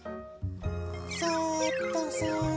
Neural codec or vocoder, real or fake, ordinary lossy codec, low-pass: none; real; none; none